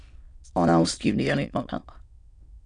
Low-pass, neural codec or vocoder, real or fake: 9.9 kHz; autoencoder, 22.05 kHz, a latent of 192 numbers a frame, VITS, trained on many speakers; fake